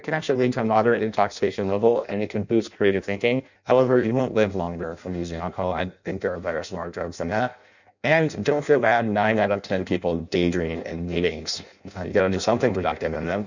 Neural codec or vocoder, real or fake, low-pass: codec, 16 kHz in and 24 kHz out, 0.6 kbps, FireRedTTS-2 codec; fake; 7.2 kHz